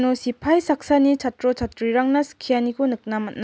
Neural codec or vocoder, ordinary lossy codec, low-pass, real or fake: none; none; none; real